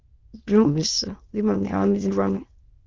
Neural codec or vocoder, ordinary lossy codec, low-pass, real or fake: autoencoder, 22.05 kHz, a latent of 192 numbers a frame, VITS, trained on many speakers; Opus, 24 kbps; 7.2 kHz; fake